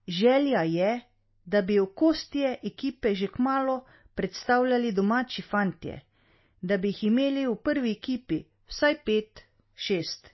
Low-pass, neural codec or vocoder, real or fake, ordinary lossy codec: 7.2 kHz; none; real; MP3, 24 kbps